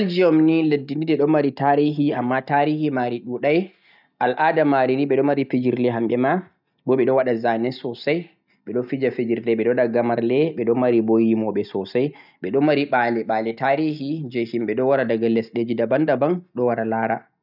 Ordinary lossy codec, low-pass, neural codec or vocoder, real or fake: none; 5.4 kHz; none; real